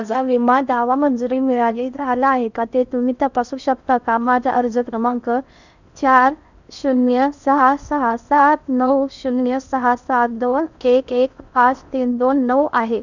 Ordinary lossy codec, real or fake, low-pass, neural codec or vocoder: none; fake; 7.2 kHz; codec, 16 kHz in and 24 kHz out, 0.6 kbps, FocalCodec, streaming, 4096 codes